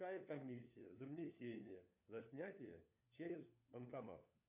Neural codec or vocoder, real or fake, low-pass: codec, 16 kHz, 4 kbps, FunCodec, trained on LibriTTS, 50 frames a second; fake; 3.6 kHz